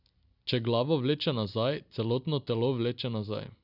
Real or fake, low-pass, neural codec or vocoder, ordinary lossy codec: real; 5.4 kHz; none; none